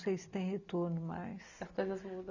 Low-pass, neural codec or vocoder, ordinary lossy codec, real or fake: 7.2 kHz; none; none; real